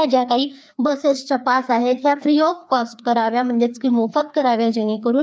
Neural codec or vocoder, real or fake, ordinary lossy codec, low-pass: codec, 16 kHz, 2 kbps, FreqCodec, larger model; fake; none; none